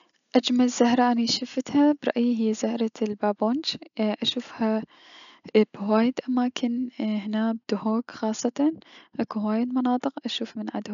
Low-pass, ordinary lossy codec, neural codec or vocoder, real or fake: 7.2 kHz; none; none; real